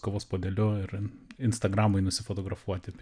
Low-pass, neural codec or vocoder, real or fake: 9.9 kHz; none; real